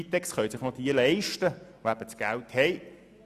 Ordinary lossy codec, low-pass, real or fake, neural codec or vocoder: Opus, 64 kbps; 14.4 kHz; real; none